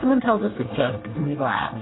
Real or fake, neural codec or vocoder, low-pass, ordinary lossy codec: fake; codec, 24 kHz, 1 kbps, SNAC; 7.2 kHz; AAC, 16 kbps